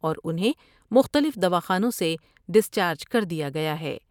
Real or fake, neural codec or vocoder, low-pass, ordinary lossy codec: fake; vocoder, 44.1 kHz, 128 mel bands every 512 samples, BigVGAN v2; 19.8 kHz; none